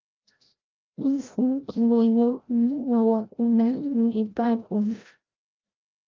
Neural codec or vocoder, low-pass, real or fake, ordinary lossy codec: codec, 16 kHz, 0.5 kbps, FreqCodec, larger model; 7.2 kHz; fake; Opus, 24 kbps